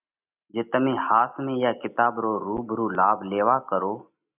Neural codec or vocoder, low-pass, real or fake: none; 3.6 kHz; real